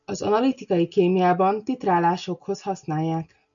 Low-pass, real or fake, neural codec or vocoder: 7.2 kHz; real; none